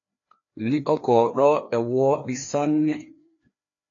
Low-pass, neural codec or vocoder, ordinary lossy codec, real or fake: 7.2 kHz; codec, 16 kHz, 2 kbps, FreqCodec, larger model; AAC, 48 kbps; fake